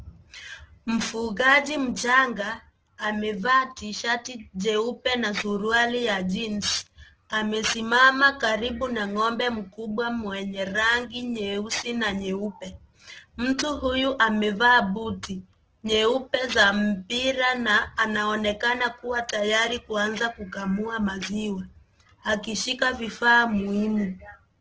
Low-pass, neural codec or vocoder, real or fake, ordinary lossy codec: 7.2 kHz; none; real; Opus, 16 kbps